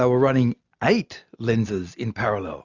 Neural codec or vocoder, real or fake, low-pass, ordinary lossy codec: none; real; 7.2 kHz; Opus, 64 kbps